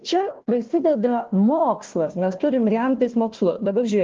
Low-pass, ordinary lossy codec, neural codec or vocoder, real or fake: 7.2 kHz; Opus, 16 kbps; codec, 16 kHz, 1 kbps, FunCodec, trained on Chinese and English, 50 frames a second; fake